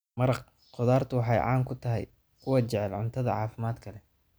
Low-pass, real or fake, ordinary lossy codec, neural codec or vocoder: none; real; none; none